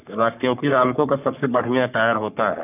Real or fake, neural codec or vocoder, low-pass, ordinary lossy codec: fake; codec, 44.1 kHz, 3.4 kbps, Pupu-Codec; 3.6 kHz; none